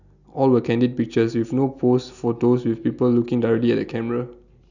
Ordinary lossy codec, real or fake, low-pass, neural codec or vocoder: none; real; 7.2 kHz; none